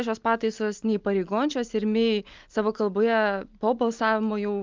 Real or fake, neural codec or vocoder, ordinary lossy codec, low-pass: real; none; Opus, 24 kbps; 7.2 kHz